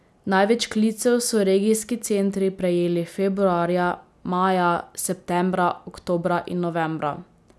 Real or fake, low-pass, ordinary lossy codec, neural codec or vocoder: real; none; none; none